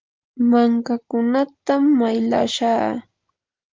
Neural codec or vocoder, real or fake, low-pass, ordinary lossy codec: none; real; 7.2 kHz; Opus, 24 kbps